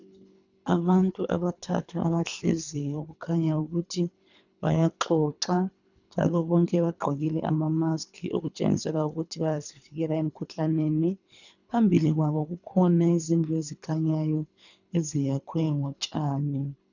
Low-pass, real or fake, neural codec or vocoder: 7.2 kHz; fake; codec, 24 kHz, 3 kbps, HILCodec